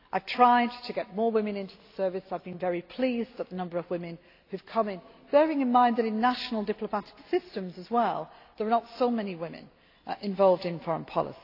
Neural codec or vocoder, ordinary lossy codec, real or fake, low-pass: none; AAC, 32 kbps; real; 5.4 kHz